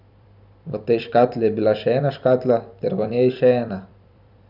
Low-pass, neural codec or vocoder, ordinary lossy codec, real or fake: 5.4 kHz; vocoder, 44.1 kHz, 128 mel bands every 256 samples, BigVGAN v2; none; fake